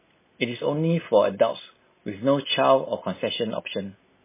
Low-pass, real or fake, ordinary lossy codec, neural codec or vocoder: 3.6 kHz; real; MP3, 16 kbps; none